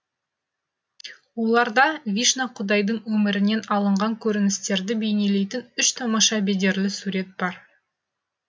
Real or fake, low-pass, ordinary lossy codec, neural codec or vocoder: real; none; none; none